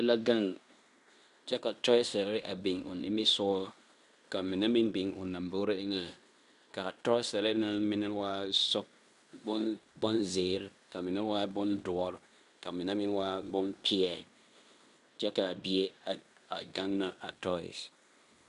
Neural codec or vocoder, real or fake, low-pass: codec, 16 kHz in and 24 kHz out, 0.9 kbps, LongCat-Audio-Codec, fine tuned four codebook decoder; fake; 10.8 kHz